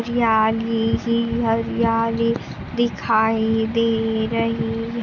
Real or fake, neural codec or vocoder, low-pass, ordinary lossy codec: real; none; 7.2 kHz; none